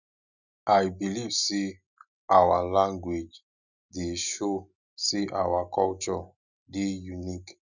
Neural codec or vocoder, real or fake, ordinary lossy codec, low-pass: none; real; none; 7.2 kHz